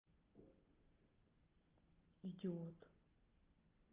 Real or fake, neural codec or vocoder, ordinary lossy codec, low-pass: real; none; Opus, 32 kbps; 3.6 kHz